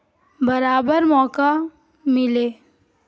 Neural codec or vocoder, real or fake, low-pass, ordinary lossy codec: none; real; none; none